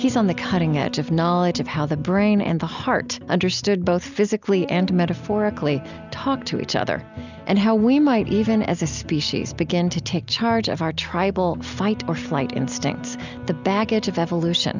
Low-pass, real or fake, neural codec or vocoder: 7.2 kHz; real; none